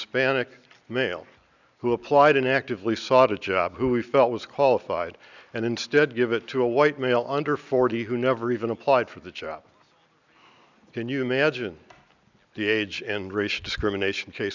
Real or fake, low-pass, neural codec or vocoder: real; 7.2 kHz; none